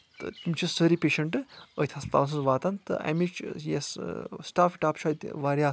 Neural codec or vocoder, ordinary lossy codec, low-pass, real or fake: none; none; none; real